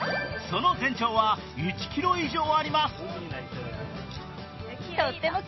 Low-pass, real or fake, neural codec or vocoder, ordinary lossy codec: 7.2 kHz; real; none; MP3, 24 kbps